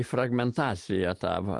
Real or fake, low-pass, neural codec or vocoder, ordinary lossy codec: real; 10.8 kHz; none; Opus, 24 kbps